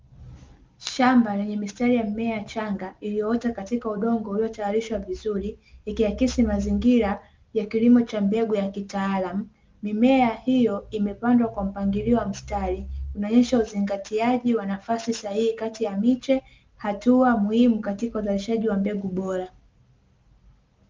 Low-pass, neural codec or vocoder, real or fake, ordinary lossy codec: 7.2 kHz; none; real; Opus, 32 kbps